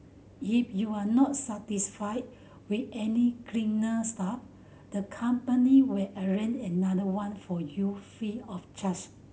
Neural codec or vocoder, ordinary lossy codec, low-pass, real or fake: none; none; none; real